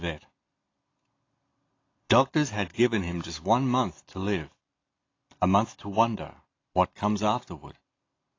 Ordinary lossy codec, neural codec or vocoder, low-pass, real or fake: AAC, 32 kbps; none; 7.2 kHz; real